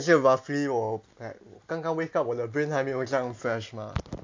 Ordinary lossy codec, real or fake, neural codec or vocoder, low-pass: MP3, 48 kbps; real; none; 7.2 kHz